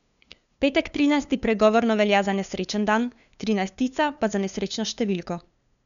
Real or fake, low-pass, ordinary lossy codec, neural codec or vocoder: fake; 7.2 kHz; none; codec, 16 kHz, 2 kbps, FunCodec, trained on LibriTTS, 25 frames a second